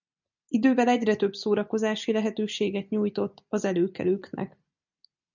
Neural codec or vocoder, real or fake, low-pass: none; real; 7.2 kHz